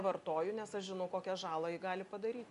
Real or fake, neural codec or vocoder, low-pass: real; none; 10.8 kHz